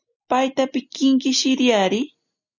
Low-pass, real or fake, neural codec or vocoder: 7.2 kHz; real; none